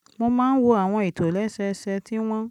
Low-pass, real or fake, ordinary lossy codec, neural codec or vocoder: 19.8 kHz; real; none; none